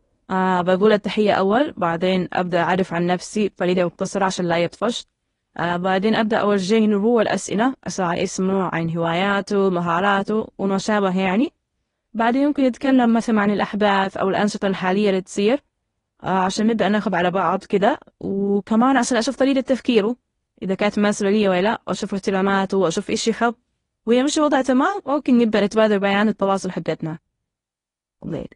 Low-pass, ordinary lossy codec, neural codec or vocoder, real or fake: 10.8 kHz; AAC, 32 kbps; codec, 24 kHz, 0.9 kbps, WavTokenizer, medium speech release version 1; fake